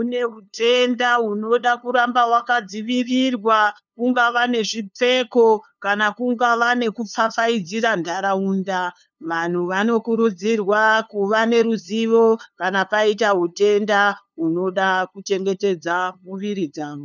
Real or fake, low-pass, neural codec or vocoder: fake; 7.2 kHz; codec, 16 kHz, 2 kbps, FunCodec, trained on LibriTTS, 25 frames a second